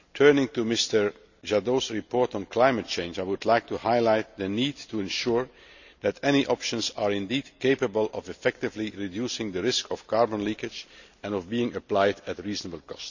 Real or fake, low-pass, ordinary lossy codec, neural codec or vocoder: real; 7.2 kHz; none; none